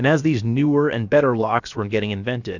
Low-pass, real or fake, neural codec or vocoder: 7.2 kHz; fake; codec, 16 kHz, about 1 kbps, DyCAST, with the encoder's durations